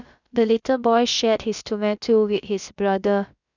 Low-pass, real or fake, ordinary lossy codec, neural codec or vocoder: 7.2 kHz; fake; none; codec, 16 kHz, about 1 kbps, DyCAST, with the encoder's durations